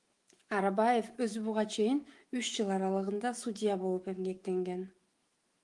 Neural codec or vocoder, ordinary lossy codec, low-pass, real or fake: autoencoder, 48 kHz, 128 numbers a frame, DAC-VAE, trained on Japanese speech; Opus, 24 kbps; 10.8 kHz; fake